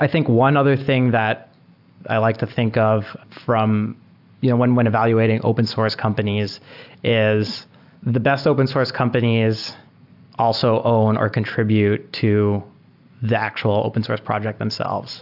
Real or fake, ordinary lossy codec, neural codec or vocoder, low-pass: real; AAC, 48 kbps; none; 5.4 kHz